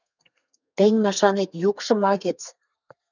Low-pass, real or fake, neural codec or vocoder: 7.2 kHz; fake; codec, 32 kHz, 1.9 kbps, SNAC